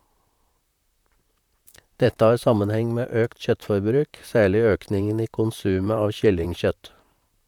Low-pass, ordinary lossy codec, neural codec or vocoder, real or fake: 19.8 kHz; none; vocoder, 44.1 kHz, 128 mel bands, Pupu-Vocoder; fake